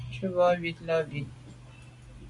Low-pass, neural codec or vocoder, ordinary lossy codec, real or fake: 10.8 kHz; none; MP3, 64 kbps; real